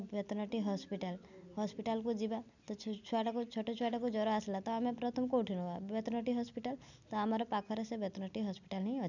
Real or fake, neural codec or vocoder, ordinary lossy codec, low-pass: real; none; none; 7.2 kHz